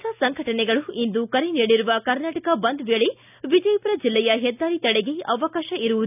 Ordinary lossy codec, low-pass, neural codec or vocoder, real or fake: none; 3.6 kHz; none; real